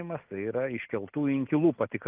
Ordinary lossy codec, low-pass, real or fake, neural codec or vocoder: Opus, 32 kbps; 3.6 kHz; real; none